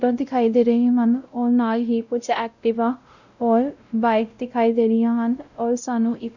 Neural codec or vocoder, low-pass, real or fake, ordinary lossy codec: codec, 16 kHz, 0.5 kbps, X-Codec, WavLM features, trained on Multilingual LibriSpeech; 7.2 kHz; fake; none